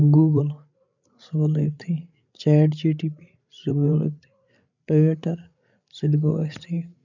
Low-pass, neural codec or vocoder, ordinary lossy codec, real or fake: 7.2 kHz; codec, 16 kHz, 8 kbps, FreqCodec, larger model; none; fake